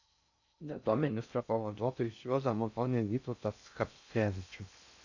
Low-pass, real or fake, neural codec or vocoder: 7.2 kHz; fake; codec, 16 kHz in and 24 kHz out, 0.6 kbps, FocalCodec, streaming, 4096 codes